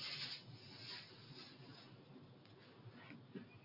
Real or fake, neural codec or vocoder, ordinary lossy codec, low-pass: real; none; AAC, 32 kbps; 5.4 kHz